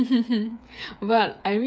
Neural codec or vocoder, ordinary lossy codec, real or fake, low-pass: codec, 16 kHz, 8 kbps, FreqCodec, smaller model; none; fake; none